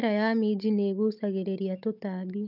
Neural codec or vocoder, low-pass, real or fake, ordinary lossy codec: codec, 16 kHz, 16 kbps, FunCodec, trained on Chinese and English, 50 frames a second; 5.4 kHz; fake; none